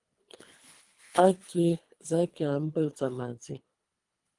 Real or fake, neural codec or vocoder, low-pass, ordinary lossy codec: fake; codec, 24 kHz, 3 kbps, HILCodec; 10.8 kHz; Opus, 32 kbps